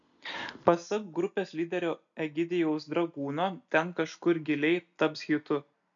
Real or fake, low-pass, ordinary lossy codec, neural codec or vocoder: real; 7.2 kHz; MP3, 96 kbps; none